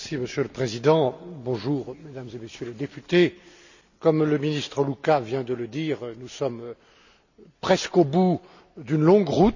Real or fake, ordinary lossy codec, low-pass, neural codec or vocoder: real; none; 7.2 kHz; none